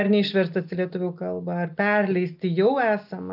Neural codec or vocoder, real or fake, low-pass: none; real; 5.4 kHz